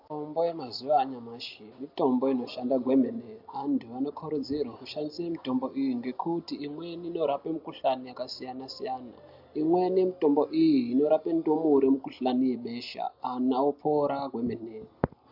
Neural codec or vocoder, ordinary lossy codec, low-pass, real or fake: none; AAC, 48 kbps; 5.4 kHz; real